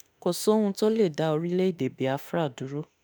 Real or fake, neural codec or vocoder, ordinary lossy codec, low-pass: fake; autoencoder, 48 kHz, 32 numbers a frame, DAC-VAE, trained on Japanese speech; none; none